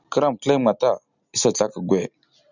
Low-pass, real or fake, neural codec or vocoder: 7.2 kHz; real; none